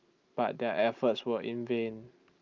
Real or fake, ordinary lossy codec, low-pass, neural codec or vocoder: real; Opus, 24 kbps; 7.2 kHz; none